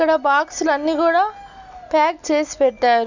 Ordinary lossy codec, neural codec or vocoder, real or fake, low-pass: none; none; real; 7.2 kHz